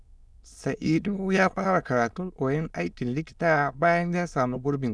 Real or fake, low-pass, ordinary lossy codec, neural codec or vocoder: fake; none; none; autoencoder, 22.05 kHz, a latent of 192 numbers a frame, VITS, trained on many speakers